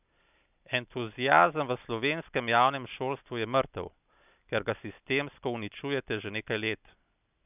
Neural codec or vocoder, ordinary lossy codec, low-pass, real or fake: none; none; 3.6 kHz; real